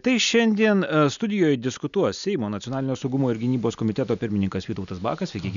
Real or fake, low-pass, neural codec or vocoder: real; 7.2 kHz; none